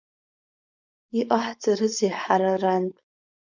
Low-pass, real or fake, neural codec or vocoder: 7.2 kHz; fake; codec, 16 kHz, 4.8 kbps, FACodec